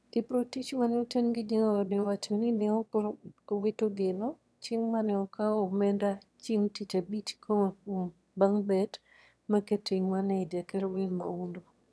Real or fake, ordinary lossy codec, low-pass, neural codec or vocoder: fake; none; none; autoencoder, 22.05 kHz, a latent of 192 numbers a frame, VITS, trained on one speaker